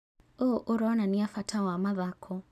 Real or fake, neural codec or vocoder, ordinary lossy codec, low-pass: real; none; none; 14.4 kHz